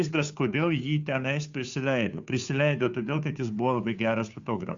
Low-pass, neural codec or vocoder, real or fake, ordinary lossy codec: 7.2 kHz; codec, 16 kHz, 2 kbps, FunCodec, trained on Chinese and English, 25 frames a second; fake; Opus, 64 kbps